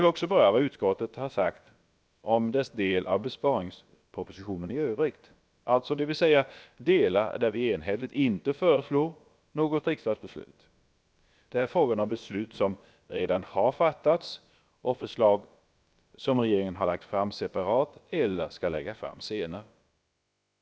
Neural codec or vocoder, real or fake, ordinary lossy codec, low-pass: codec, 16 kHz, about 1 kbps, DyCAST, with the encoder's durations; fake; none; none